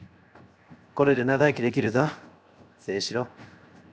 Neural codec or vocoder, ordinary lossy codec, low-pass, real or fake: codec, 16 kHz, 0.7 kbps, FocalCodec; none; none; fake